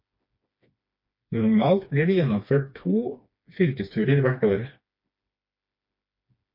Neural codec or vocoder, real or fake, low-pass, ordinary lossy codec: codec, 16 kHz, 2 kbps, FreqCodec, smaller model; fake; 5.4 kHz; MP3, 32 kbps